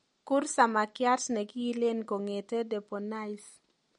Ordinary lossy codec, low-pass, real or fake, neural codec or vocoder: MP3, 48 kbps; 10.8 kHz; real; none